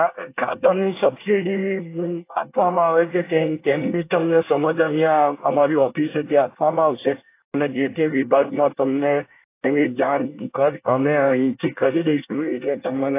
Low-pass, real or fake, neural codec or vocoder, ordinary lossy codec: 3.6 kHz; fake; codec, 24 kHz, 1 kbps, SNAC; AAC, 24 kbps